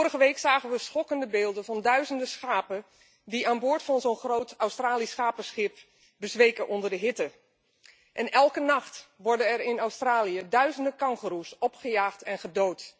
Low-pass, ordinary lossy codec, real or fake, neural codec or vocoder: none; none; real; none